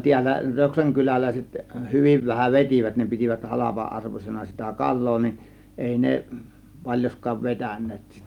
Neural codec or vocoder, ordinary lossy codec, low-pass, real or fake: none; Opus, 32 kbps; 19.8 kHz; real